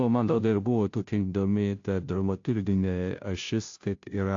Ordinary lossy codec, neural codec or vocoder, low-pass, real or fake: AAC, 48 kbps; codec, 16 kHz, 0.5 kbps, FunCodec, trained on Chinese and English, 25 frames a second; 7.2 kHz; fake